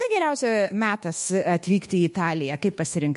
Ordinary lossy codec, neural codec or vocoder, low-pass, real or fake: MP3, 48 kbps; codec, 24 kHz, 1.2 kbps, DualCodec; 10.8 kHz; fake